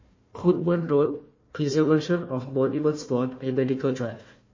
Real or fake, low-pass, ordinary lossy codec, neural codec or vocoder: fake; 7.2 kHz; MP3, 32 kbps; codec, 16 kHz, 1 kbps, FunCodec, trained on Chinese and English, 50 frames a second